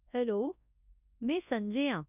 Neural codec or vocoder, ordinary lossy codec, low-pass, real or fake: codec, 24 kHz, 0.9 kbps, WavTokenizer, large speech release; none; 3.6 kHz; fake